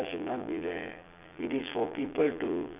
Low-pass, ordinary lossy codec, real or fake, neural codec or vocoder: 3.6 kHz; none; fake; vocoder, 22.05 kHz, 80 mel bands, Vocos